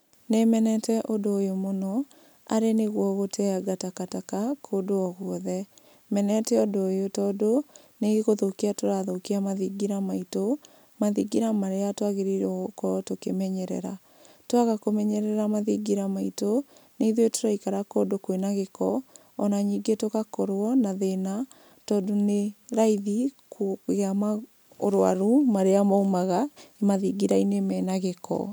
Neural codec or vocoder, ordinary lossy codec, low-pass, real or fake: none; none; none; real